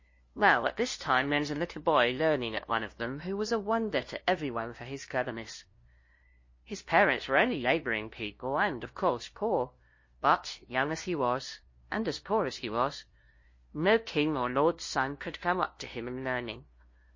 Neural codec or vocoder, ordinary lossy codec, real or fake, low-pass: codec, 16 kHz, 0.5 kbps, FunCodec, trained on LibriTTS, 25 frames a second; MP3, 32 kbps; fake; 7.2 kHz